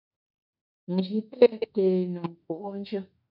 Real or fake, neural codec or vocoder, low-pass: fake; autoencoder, 48 kHz, 32 numbers a frame, DAC-VAE, trained on Japanese speech; 5.4 kHz